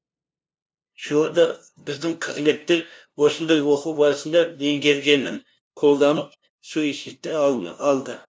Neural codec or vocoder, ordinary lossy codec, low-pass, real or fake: codec, 16 kHz, 0.5 kbps, FunCodec, trained on LibriTTS, 25 frames a second; none; none; fake